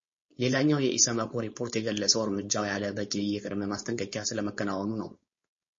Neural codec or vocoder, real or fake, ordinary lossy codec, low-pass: codec, 16 kHz, 4.8 kbps, FACodec; fake; MP3, 32 kbps; 7.2 kHz